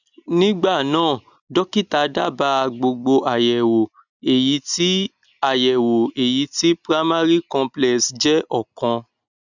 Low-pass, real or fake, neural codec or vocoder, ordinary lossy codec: 7.2 kHz; real; none; none